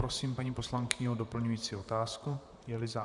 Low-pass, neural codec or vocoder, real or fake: 10.8 kHz; vocoder, 44.1 kHz, 128 mel bands, Pupu-Vocoder; fake